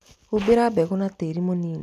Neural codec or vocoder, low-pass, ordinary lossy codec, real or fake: none; 14.4 kHz; none; real